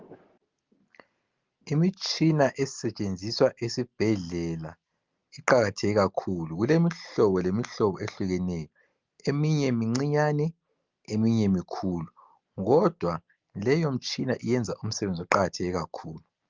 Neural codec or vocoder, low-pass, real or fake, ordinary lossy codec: none; 7.2 kHz; real; Opus, 32 kbps